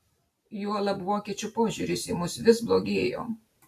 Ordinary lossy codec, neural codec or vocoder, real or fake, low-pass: AAC, 64 kbps; none; real; 14.4 kHz